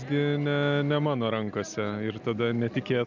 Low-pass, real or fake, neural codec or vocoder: 7.2 kHz; real; none